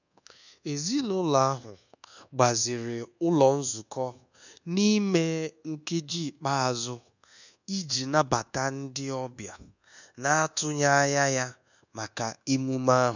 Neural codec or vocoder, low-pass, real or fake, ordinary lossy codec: codec, 24 kHz, 1.2 kbps, DualCodec; 7.2 kHz; fake; none